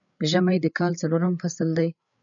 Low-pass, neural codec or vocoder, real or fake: 7.2 kHz; codec, 16 kHz, 8 kbps, FreqCodec, larger model; fake